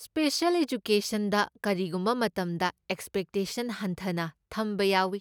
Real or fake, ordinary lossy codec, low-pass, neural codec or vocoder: real; none; none; none